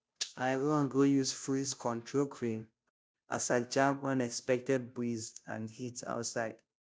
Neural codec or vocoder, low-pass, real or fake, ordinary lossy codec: codec, 16 kHz, 0.5 kbps, FunCodec, trained on Chinese and English, 25 frames a second; none; fake; none